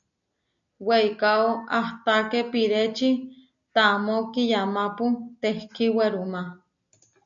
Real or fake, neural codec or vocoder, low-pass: real; none; 7.2 kHz